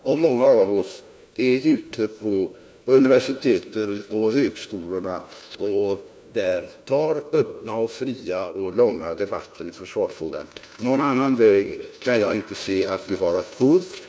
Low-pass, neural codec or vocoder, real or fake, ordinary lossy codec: none; codec, 16 kHz, 1 kbps, FunCodec, trained on LibriTTS, 50 frames a second; fake; none